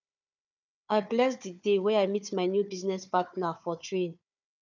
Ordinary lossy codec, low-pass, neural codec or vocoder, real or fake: none; 7.2 kHz; codec, 16 kHz, 4 kbps, FunCodec, trained on Chinese and English, 50 frames a second; fake